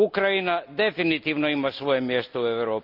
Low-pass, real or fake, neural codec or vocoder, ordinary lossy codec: 5.4 kHz; real; none; Opus, 24 kbps